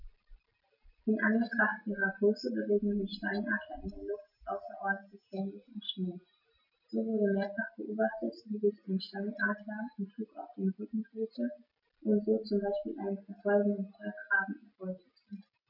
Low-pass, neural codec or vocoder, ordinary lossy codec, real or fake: 5.4 kHz; none; none; real